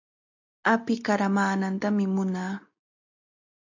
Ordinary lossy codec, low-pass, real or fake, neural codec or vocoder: AAC, 48 kbps; 7.2 kHz; real; none